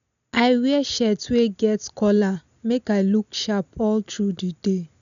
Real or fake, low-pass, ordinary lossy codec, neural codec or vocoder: real; 7.2 kHz; none; none